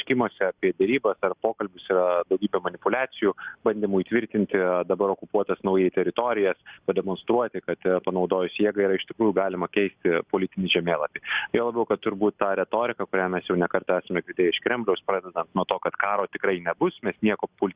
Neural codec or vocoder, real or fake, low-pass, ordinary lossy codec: none; real; 3.6 kHz; Opus, 64 kbps